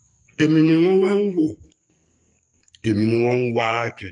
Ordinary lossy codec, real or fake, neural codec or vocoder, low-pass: MP3, 64 kbps; fake; codec, 44.1 kHz, 2.6 kbps, SNAC; 10.8 kHz